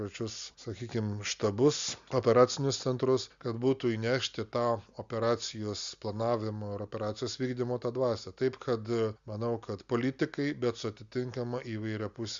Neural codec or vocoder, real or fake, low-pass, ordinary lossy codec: none; real; 7.2 kHz; Opus, 64 kbps